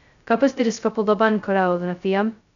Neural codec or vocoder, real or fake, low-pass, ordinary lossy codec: codec, 16 kHz, 0.2 kbps, FocalCodec; fake; 7.2 kHz; none